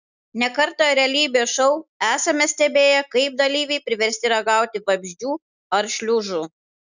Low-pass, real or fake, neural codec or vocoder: 7.2 kHz; real; none